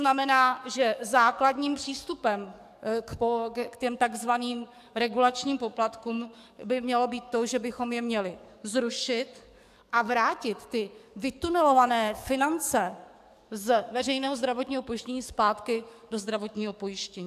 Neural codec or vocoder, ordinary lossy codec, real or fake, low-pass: codec, 44.1 kHz, 7.8 kbps, DAC; AAC, 96 kbps; fake; 14.4 kHz